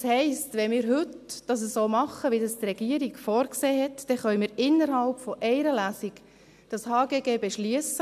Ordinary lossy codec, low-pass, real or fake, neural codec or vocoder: AAC, 96 kbps; 14.4 kHz; real; none